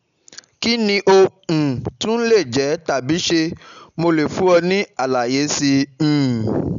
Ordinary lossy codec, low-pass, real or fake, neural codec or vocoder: none; 7.2 kHz; real; none